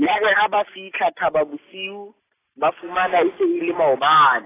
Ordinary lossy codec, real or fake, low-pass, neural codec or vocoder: AAC, 16 kbps; real; 3.6 kHz; none